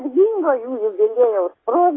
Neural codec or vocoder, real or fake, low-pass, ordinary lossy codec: none; real; 7.2 kHz; AAC, 16 kbps